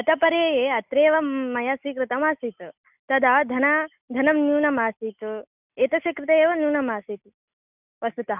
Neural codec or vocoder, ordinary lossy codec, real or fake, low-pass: none; none; real; 3.6 kHz